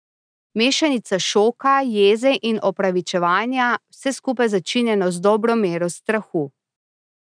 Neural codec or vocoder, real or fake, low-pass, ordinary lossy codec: none; real; 9.9 kHz; none